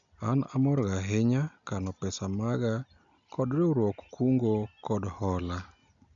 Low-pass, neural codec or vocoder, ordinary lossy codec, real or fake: 7.2 kHz; none; none; real